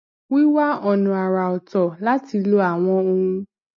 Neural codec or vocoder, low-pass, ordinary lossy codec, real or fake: none; 7.2 kHz; MP3, 32 kbps; real